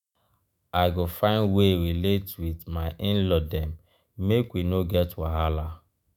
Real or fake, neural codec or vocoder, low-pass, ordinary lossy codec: fake; autoencoder, 48 kHz, 128 numbers a frame, DAC-VAE, trained on Japanese speech; 19.8 kHz; none